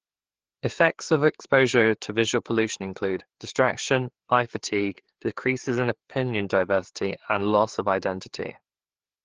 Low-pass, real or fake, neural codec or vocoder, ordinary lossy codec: 7.2 kHz; fake; codec, 16 kHz, 4 kbps, FreqCodec, larger model; Opus, 16 kbps